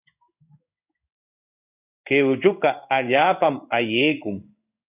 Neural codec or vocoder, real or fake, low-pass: codec, 16 kHz in and 24 kHz out, 1 kbps, XY-Tokenizer; fake; 3.6 kHz